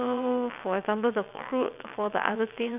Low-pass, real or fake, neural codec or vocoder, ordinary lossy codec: 3.6 kHz; fake; vocoder, 22.05 kHz, 80 mel bands, WaveNeXt; none